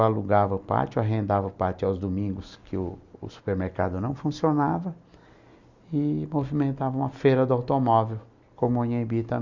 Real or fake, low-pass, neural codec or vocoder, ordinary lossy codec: real; 7.2 kHz; none; Opus, 64 kbps